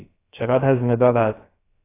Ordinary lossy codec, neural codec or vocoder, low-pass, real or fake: AAC, 16 kbps; codec, 16 kHz, about 1 kbps, DyCAST, with the encoder's durations; 3.6 kHz; fake